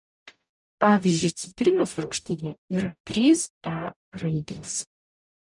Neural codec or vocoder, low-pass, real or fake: codec, 44.1 kHz, 0.9 kbps, DAC; 10.8 kHz; fake